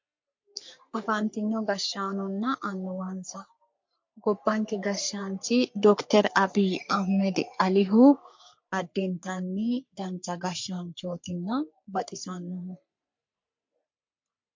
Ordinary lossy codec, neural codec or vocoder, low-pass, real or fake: MP3, 48 kbps; codec, 44.1 kHz, 3.4 kbps, Pupu-Codec; 7.2 kHz; fake